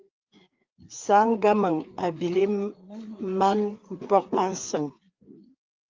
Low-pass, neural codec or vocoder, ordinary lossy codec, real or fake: 7.2 kHz; codec, 16 kHz, 4 kbps, FreqCodec, larger model; Opus, 32 kbps; fake